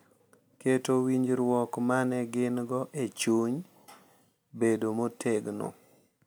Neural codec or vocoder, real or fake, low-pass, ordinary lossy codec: none; real; none; none